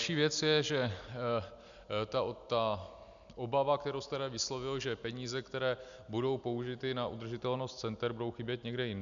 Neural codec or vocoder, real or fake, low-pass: none; real; 7.2 kHz